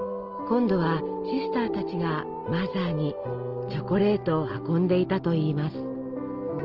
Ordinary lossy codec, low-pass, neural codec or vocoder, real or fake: Opus, 16 kbps; 5.4 kHz; none; real